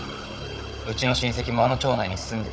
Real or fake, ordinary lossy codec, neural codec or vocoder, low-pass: fake; none; codec, 16 kHz, 16 kbps, FunCodec, trained on Chinese and English, 50 frames a second; none